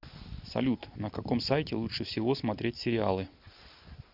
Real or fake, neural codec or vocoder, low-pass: real; none; 5.4 kHz